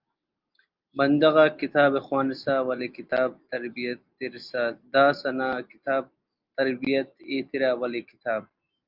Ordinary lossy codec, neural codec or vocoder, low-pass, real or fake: Opus, 32 kbps; none; 5.4 kHz; real